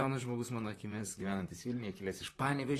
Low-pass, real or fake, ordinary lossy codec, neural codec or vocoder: 14.4 kHz; fake; AAC, 48 kbps; vocoder, 44.1 kHz, 128 mel bands, Pupu-Vocoder